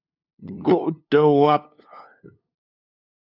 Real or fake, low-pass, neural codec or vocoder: fake; 5.4 kHz; codec, 16 kHz, 2 kbps, FunCodec, trained on LibriTTS, 25 frames a second